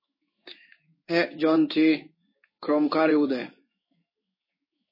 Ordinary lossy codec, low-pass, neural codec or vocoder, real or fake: MP3, 24 kbps; 5.4 kHz; codec, 16 kHz in and 24 kHz out, 1 kbps, XY-Tokenizer; fake